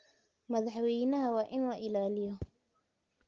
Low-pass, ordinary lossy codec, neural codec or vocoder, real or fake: 7.2 kHz; Opus, 16 kbps; none; real